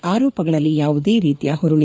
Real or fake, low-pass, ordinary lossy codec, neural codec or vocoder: fake; none; none; codec, 16 kHz, 4 kbps, FreqCodec, larger model